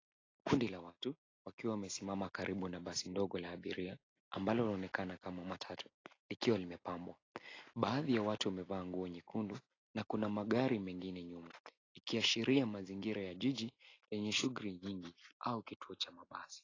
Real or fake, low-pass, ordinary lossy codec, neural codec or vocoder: real; 7.2 kHz; AAC, 32 kbps; none